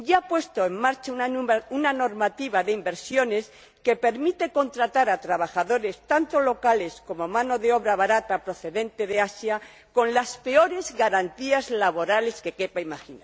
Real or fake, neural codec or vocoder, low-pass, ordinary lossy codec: real; none; none; none